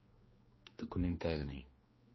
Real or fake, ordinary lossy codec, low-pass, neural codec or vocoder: fake; MP3, 24 kbps; 7.2 kHz; codec, 16 kHz, 2 kbps, X-Codec, HuBERT features, trained on general audio